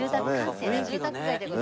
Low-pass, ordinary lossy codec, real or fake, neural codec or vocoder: none; none; real; none